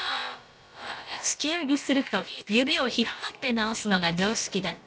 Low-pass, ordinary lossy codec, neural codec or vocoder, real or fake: none; none; codec, 16 kHz, about 1 kbps, DyCAST, with the encoder's durations; fake